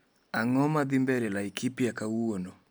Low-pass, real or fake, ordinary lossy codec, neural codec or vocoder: none; real; none; none